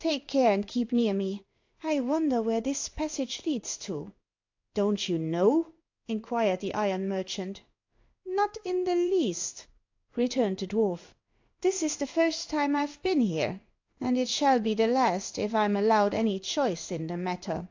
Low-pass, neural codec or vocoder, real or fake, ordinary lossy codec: 7.2 kHz; codec, 16 kHz in and 24 kHz out, 1 kbps, XY-Tokenizer; fake; AAC, 48 kbps